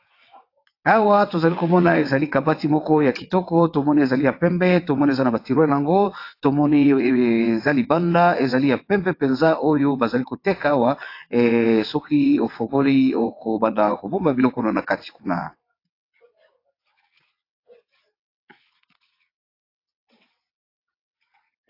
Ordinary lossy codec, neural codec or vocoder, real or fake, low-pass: AAC, 32 kbps; vocoder, 22.05 kHz, 80 mel bands, WaveNeXt; fake; 5.4 kHz